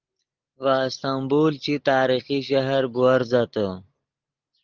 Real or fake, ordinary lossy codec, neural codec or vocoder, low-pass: real; Opus, 16 kbps; none; 7.2 kHz